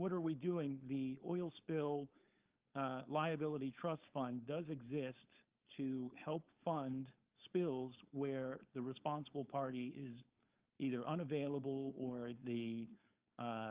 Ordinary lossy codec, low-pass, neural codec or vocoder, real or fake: Opus, 32 kbps; 3.6 kHz; codec, 16 kHz, 4.8 kbps, FACodec; fake